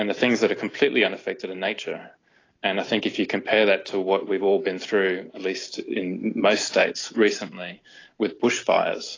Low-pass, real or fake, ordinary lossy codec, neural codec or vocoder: 7.2 kHz; real; AAC, 32 kbps; none